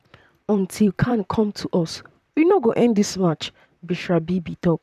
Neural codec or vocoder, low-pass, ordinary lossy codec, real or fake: vocoder, 44.1 kHz, 128 mel bands, Pupu-Vocoder; 14.4 kHz; none; fake